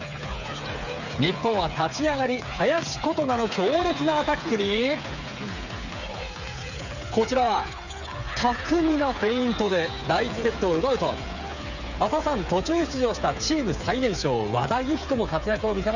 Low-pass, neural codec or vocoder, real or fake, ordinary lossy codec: 7.2 kHz; codec, 16 kHz, 8 kbps, FreqCodec, smaller model; fake; none